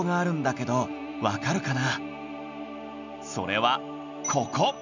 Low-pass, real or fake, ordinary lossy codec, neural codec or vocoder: 7.2 kHz; real; none; none